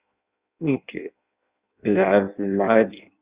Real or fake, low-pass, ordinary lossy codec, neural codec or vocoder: fake; 3.6 kHz; Opus, 64 kbps; codec, 16 kHz in and 24 kHz out, 0.6 kbps, FireRedTTS-2 codec